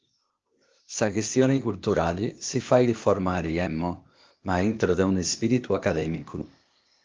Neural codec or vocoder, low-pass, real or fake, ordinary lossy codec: codec, 16 kHz, 0.8 kbps, ZipCodec; 7.2 kHz; fake; Opus, 24 kbps